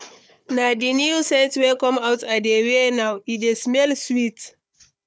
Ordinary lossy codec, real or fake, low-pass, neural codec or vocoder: none; fake; none; codec, 16 kHz, 4 kbps, FunCodec, trained on Chinese and English, 50 frames a second